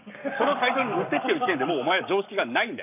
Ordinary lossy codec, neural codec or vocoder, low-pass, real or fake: none; codec, 44.1 kHz, 7.8 kbps, Pupu-Codec; 3.6 kHz; fake